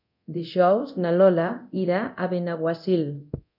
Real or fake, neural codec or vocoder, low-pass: fake; codec, 24 kHz, 0.9 kbps, DualCodec; 5.4 kHz